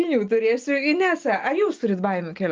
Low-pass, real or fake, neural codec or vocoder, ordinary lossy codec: 7.2 kHz; real; none; Opus, 32 kbps